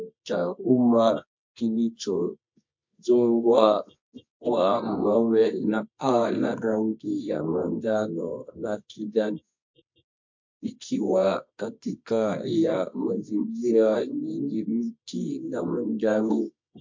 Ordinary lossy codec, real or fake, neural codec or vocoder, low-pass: MP3, 48 kbps; fake; codec, 24 kHz, 0.9 kbps, WavTokenizer, medium music audio release; 7.2 kHz